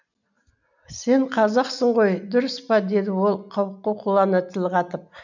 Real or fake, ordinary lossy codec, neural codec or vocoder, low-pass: real; none; none; 7.2 kHz